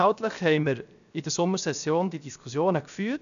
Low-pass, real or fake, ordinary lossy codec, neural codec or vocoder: 7.2 kHz; fake; none; codec, 16 kHz, 0.7 kbps, FocalCodec